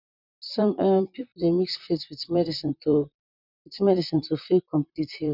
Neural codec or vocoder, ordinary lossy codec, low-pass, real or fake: vocoder, 44.1 kHz, 128 mel bands, Pupu-Vocoder; none; 5.4 kHz; fake